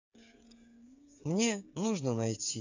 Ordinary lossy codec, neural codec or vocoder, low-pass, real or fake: AAC, 48 kbps; codec, 16 kHz, 8 kbps, FreqCodec, smaller model; 7.2 kHz; fake